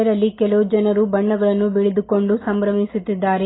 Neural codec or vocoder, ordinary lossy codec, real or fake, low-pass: none; AAC, 16 kbps; real; 7.2 kHz